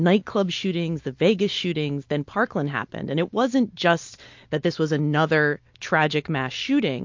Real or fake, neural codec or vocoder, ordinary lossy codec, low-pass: real; none; MP3, 48 kbps; 7.2 kHz